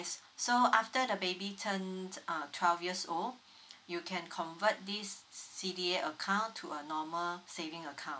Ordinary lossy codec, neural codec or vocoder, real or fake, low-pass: none; none; real; none